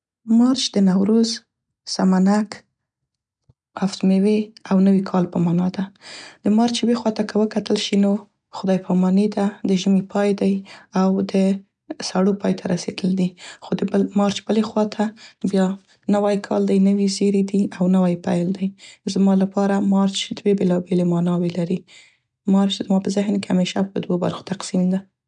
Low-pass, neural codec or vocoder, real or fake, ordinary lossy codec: 9.9 kHz; none; real; none